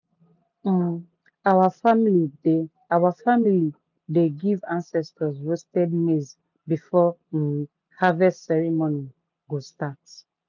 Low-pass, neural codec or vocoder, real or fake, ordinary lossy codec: 7.2 kHz; none; real; none